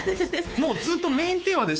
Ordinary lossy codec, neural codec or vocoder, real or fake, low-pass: none; codec, 16 kHz, 4 kbps, X-Codec, WavLM features, trained on Multilingual LibriSpeech; fake; none